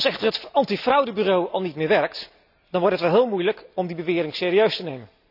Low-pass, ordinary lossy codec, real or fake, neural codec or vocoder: 5.4 kHz; none; real; none